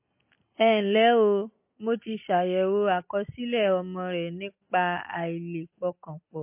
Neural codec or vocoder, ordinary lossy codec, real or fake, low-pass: none; MP3, 24 kbps; real; 3.6 kHz